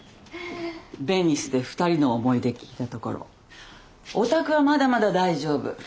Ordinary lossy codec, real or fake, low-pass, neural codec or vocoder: none; real; none; none